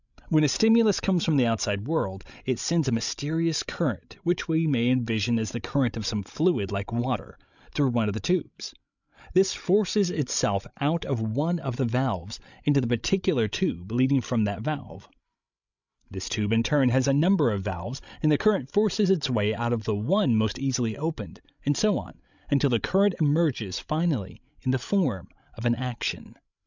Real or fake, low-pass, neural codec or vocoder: fake; 7.2 kHz; codec, 16 kHz, 16 kbps, FreqCodec, larger model